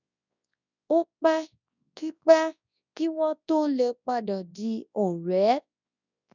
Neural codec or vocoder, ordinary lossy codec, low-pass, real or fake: codec, 24 kHz, 0.9 kbps, WavTokenizer, large speech release; none; 7.2 kHz; fake